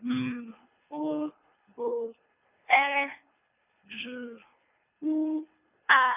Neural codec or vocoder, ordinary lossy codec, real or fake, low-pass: codec, 24 kHz, 3 kbps, HILCodec; none; fake; 3.6 kHz